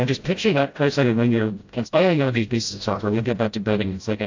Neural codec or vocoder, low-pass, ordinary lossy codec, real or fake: codec, 16 kHz, 0.5 kbps, FreqCodec, smaller model; 7.2 kHz; AAC, 48 kbps; fake